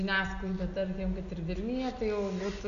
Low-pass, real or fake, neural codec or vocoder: 7.2 kHz; real; none